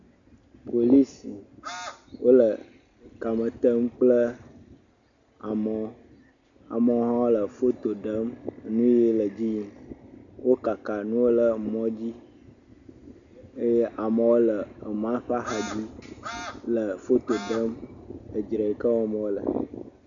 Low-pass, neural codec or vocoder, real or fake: 7.2 kHz; none; real